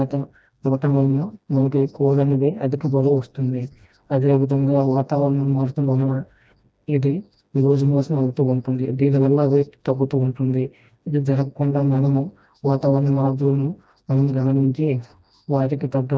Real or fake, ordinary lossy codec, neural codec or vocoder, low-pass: fake; none; codec, 16 kHz, 1 kbps, FreqCodec, smaller model; none